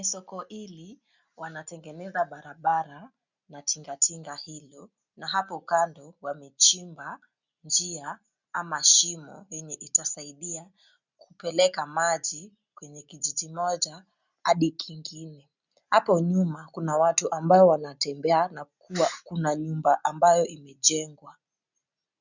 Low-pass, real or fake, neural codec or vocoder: 7.2 kHz; real; none